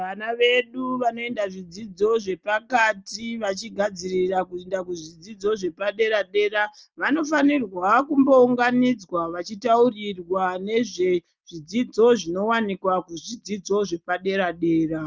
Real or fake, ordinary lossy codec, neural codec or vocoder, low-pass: real; Opus, 24 kbps; none; 7.2 kHz